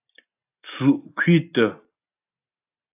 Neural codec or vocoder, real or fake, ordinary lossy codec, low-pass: none; real; AAC, 32 kbps; 3.6 kHz